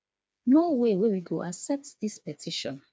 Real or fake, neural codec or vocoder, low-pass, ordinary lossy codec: fake; codec, 16 kHz, 4 kbps, FreqCodec, smaller model; none; none